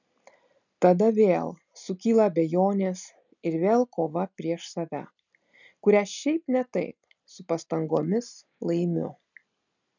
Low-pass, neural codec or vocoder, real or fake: 7.2 kHz; none; real